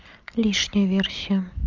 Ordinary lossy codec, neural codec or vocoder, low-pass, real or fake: Opus, 32 kbps; none; 7.2 kHz; real